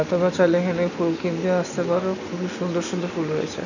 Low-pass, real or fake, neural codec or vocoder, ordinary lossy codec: 7.2 kHz; real; none; none